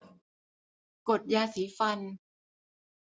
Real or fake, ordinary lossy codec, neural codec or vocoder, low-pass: real; none; none; none